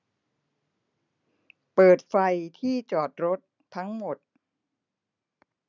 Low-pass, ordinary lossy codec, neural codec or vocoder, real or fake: 7.2 kHz; none; none; real